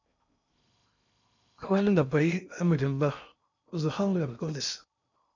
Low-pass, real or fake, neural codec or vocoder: 7.2 kHz; fake; codec, 16 kHz in and 24 kHz out, 0.6 kbps, FocalCodec, streaming, 2048 codes